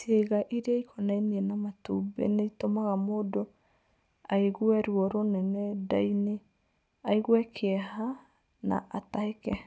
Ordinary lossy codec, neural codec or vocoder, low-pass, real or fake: none; none; none; real